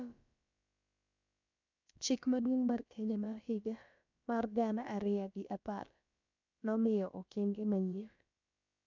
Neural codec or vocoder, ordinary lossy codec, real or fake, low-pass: codec, 16 kHz, about 1 kbps, DyCAST, with the encoder's durations; MP3, 64 kbps; fake; 7.2 kHz